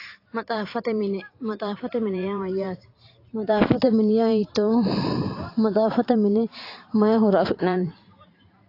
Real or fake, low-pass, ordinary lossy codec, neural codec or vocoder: fake; 5.4 kHz; AAC, 32 kbps; vocoder, 44.1 kHz, 128 mel bands every 512 samples, BigVGAN v2